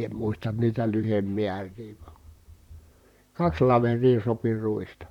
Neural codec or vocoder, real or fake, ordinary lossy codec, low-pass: vocoder, 44.1 kHz, 128 mel bands, Pupu-Vocoder; fake; none; 19.8 kHz